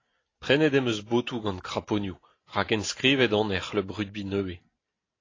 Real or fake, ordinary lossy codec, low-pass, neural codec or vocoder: real; AAC, 32 kbps; 7.2 kHz; none